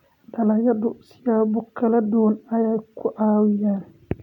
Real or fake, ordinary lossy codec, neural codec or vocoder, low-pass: real; none; none; 19.8 kHz